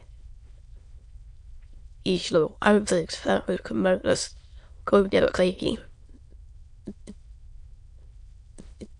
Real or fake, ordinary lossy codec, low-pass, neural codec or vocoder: fake; MP3, 64 kbps; 9.9 kHz; autoencoder, 22.05 kHz, a latent of 192 numbers a frame, VITS, trained on many speakers